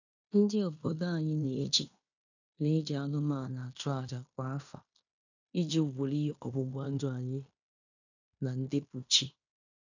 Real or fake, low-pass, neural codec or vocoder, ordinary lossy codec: fake; 7.2 kHz; codec, 16 kHz in and 24 kHz out, 0.9 kbps, LongCat-Audio-Codec, fine tuned four codebook decoder; none